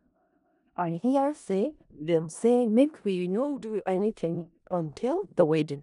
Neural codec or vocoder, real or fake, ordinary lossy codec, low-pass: codec, 16 kHz in and 24 kHz out, 0.4 kbps, LongCat-Audio-Codec, four codebook decoder; fake; none; 10.8 kHz